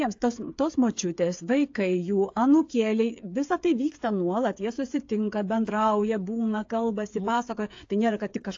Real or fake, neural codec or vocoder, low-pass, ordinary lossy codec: fake; codec, 16 kHz, 8 kbps, FreqCodec, smaller model; 7.2 kHz; AAC, 48 kbps